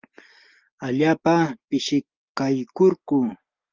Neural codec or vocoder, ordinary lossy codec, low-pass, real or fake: none; Opus, 32 kbps; 7.2 kHz; real